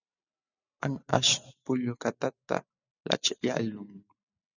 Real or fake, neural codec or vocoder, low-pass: real; none; 7.2 kHz